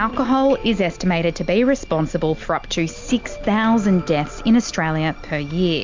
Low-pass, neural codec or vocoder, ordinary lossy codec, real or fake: 7.2 kHz; none; MP3, 64 kbps; real